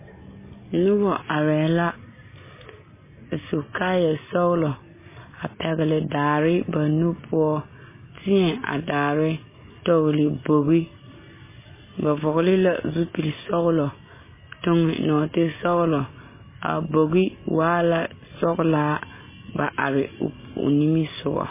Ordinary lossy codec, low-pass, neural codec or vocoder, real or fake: MP3, 16 kbps; 3.6 kHz; none; real